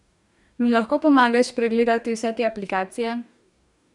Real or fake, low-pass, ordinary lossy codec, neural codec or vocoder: fake; 10.8 kHz; none; codec, 44.1 kHz, 2.6 kbps, DAC